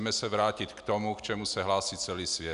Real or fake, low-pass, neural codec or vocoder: real; 10.8 kHz; none